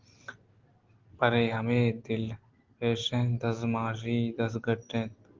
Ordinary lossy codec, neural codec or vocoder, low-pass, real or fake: Opus, 16 kbps; none; 7.2 kHz; real